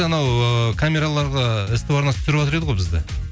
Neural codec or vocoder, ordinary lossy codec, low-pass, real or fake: none; none; none; real